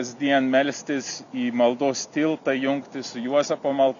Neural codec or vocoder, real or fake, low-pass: none; real; 7.2 kHz